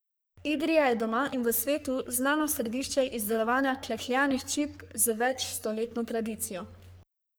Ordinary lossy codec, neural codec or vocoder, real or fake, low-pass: none; codec, 44.1 kHz, 3.4 kbps, Pupu-Codec; fake; none